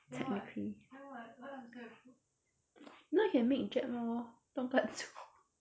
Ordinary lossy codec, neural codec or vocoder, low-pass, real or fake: none; none; none; real